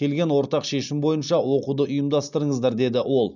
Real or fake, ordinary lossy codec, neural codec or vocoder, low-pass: real; none; none; 7.2 kHz